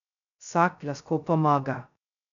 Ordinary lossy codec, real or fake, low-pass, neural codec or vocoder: none; fake; 7.2 kHz; codec, 16 kHz, 0.2 kbps, FocalCodec